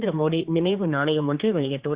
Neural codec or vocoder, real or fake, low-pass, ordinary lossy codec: codec, 16 kHz, 2 kbps, X-Codec, HuBERT features, trained on general audio; fake; 3.6 kHz; Opus, 64 kbps